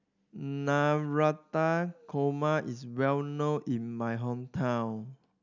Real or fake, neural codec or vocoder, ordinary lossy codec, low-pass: real; none; none; 7.2 kHz